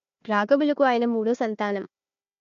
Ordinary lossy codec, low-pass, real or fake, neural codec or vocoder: MP3, 48 kbps; 7.2 kHz; fake; codec, 16 kHz, 1 kbps, FunCodec, trained on Chinese and English, 50 frames a second